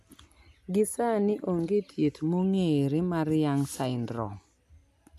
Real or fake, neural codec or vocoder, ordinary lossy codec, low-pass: real; none; AAC, 96 kbps; 14.4 kHz